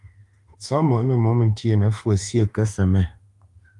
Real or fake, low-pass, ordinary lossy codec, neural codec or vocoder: fake; 10.8 kHz; Opus, 32 kbps; autoencoder, 48 kHz, 32 numbers a frame, DAC-VAE, trained on Japanese speech